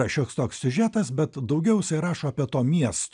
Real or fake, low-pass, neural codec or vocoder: real; 9.9 kHz; none